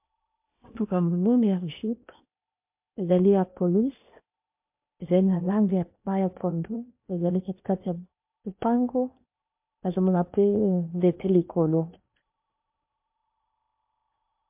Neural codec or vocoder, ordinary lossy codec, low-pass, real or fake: codec, 16 kHz in and 24 kHz out, 0.8 kbps, FocalCodec, streaming, 65536 codes; MP3, 32 kbps; 3.6 kHz; fake